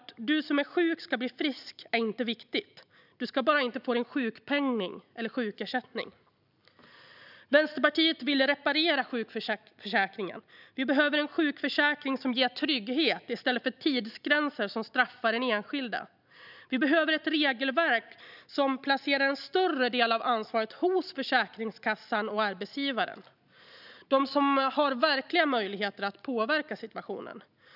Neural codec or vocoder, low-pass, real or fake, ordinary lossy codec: none; 5.4 kHz; real; none